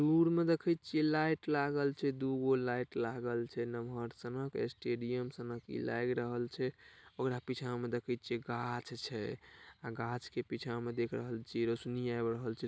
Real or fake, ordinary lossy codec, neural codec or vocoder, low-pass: real; none; none; none